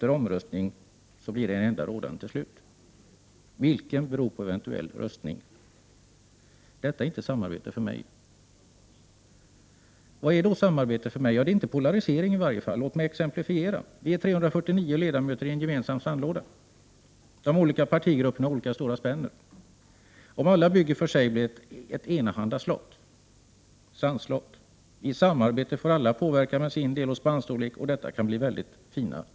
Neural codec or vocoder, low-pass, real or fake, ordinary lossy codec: none; none; real; none